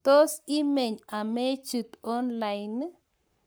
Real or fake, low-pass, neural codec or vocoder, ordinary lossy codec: fake; none; codec, 44.1 kHz, 7.8 kbps, Pupu-Codec; none